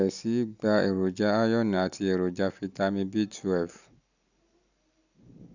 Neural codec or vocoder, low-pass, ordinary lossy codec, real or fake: none; 7.2 kHz; none; real